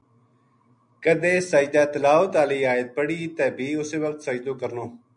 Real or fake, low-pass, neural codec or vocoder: real; 9.9 kHz; none